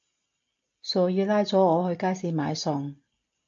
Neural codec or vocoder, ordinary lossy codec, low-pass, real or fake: none; AAC, 64 kbps; 7.2 kHz; real